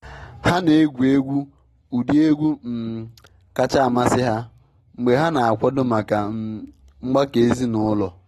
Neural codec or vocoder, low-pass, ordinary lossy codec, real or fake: none; 19.8 kHz; AAC, 32 kbps; real